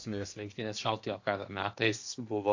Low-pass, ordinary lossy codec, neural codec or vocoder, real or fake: 7.2 kHz; AAC, 48 kbps; codec, 16 kHz in and 24 kHz out, 0.8 kbps, FocalCodec, streaming, 65536 codes; fake